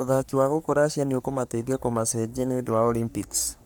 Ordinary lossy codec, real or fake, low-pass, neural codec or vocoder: none; fake; none; codec, 44.1 kHz, 3.4 kbps, Pupu-Codec